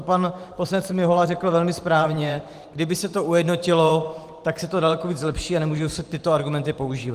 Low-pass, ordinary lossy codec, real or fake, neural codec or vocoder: 14.4 kHz; Opus, 24 kbps; fake; vocoder, 44.1 kHz, 128 mel bands every 512 samples, BigVGAN v2